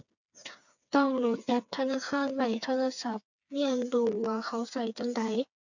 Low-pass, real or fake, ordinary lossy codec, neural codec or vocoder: 7.2 kHz; fake; none; codec, 16 kHz, 4 kbps, FreqCodec, smaller model